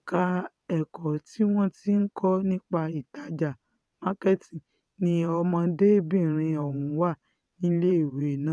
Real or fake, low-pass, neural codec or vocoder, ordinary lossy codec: fake; none; vocoder, 22.05 kHz, 80 mel bands, WaveNeXt; none